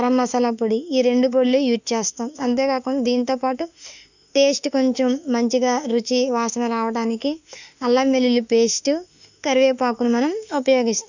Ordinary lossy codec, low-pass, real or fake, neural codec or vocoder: none; 7.2 kHz; fake; autoencoder, 48 kHz, 32 numbers a frame, DAC-VAE, trained on Japanese speech